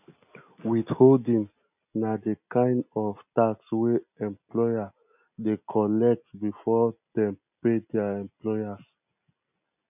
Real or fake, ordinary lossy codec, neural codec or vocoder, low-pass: real; none; none; 3.6 kHz